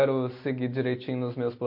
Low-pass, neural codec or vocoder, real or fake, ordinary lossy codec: 5.4 kHz; none; real; MP3, 32 kbps